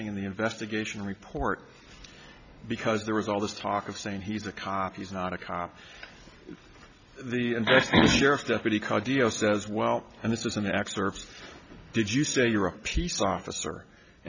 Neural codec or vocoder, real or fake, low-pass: none; real; 7.2 kHz